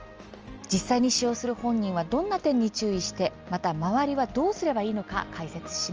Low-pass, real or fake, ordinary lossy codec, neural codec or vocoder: 7.2 kHz; real; Opus, 24 kbps; none